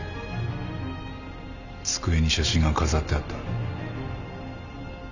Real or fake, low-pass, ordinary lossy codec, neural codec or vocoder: real; 7.2 kHz; none; none